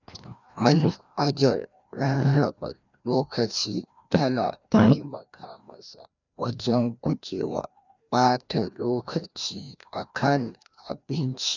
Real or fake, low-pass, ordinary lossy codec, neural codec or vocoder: fake; 7.2 kHz; none; codec, 16 kHz, 1 kbps, FreqCodec, larger model